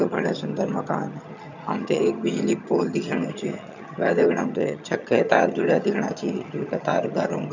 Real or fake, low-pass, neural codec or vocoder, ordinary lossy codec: fake; 7.2 kHz; vocoder, 22.05 kHz, 80 mel bands, HiFi-GAN; none